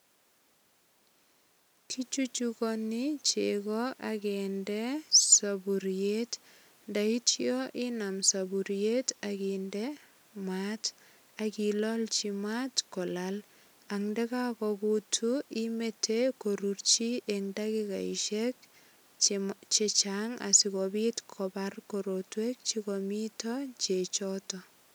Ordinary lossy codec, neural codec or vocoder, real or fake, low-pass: none; none; real; none